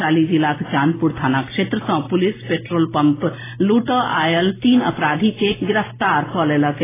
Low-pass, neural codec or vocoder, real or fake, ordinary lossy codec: 3.6 kHz; none; real; AAC, 16 kbps